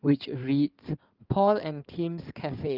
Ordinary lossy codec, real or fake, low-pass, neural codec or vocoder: Opus, 24 kbps; fake; 5.4 kHz; codec, 16 kHz in and 24 kHz out, 2.2 kbps, FireRedTTS-2 codec